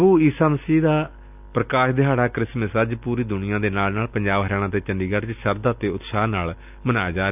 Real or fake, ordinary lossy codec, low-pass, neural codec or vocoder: real; none; 3.6 kHz; none